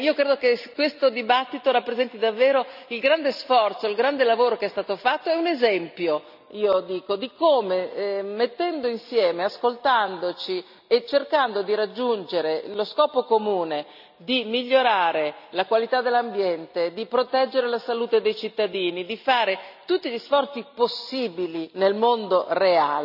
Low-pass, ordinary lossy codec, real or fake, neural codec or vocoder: 5.4 kHz; none; real; none